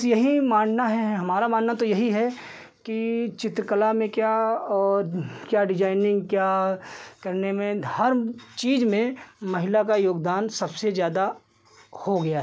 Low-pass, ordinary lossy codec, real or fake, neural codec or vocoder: none; none; real; none